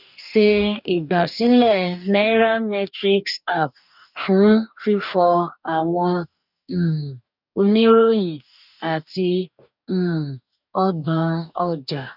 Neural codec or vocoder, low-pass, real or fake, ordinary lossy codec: codec, 44.1 kHz, 2.6 kbps, DAC; 5.4 kHz; fake; none